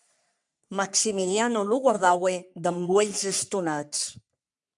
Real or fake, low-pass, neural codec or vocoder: fake; 10.8 kHz; codec, 44.1 kHz, 3.4 kbps, Pupu-Codec